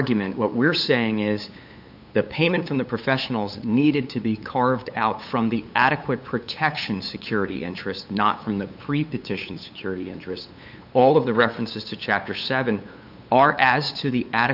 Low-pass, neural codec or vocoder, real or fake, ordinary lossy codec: 5.4 kHz; codec, 16 kHz, 8 kbps, FunCodec, trained on LibriTTS, 25 frames a second; fake; AAC, 48 kbps